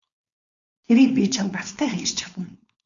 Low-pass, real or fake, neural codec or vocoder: 7.2 kHz; fake; codec, 16 kHz, 4.8 kbps, FACodec